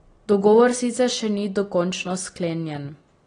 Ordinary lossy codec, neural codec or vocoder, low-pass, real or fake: AAC, 32 kbps; none; 9.9 kHz; real